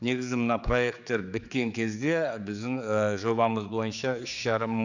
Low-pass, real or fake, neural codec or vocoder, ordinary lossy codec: 7.2 kHz; fake; codec, 16 kHz, 4 kbps, X-Codec, HuBERT features, trained on general audio; none